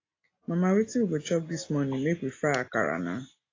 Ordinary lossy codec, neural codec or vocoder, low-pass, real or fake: AAC, 32 kbps; none; 7.2 kHz; real